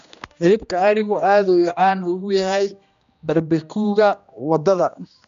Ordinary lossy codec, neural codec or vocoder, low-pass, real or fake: MP3, 64 kbps; codec, 16 kHz, 1 kbps, X-Codec, HuBERT features, trained on general audio; 7.2 kHz; fake